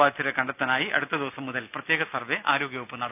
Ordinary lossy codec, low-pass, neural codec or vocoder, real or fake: none; 3.6 kHz; none; real